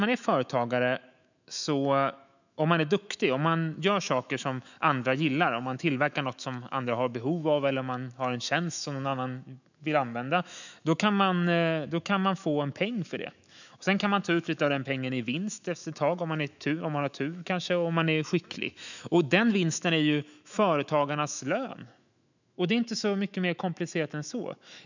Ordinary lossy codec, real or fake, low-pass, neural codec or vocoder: none; fake; 7.2 kHz; autoencoder, 48 kHz, 128 numbers a frame, DAC-VAE, trained on Japanese speech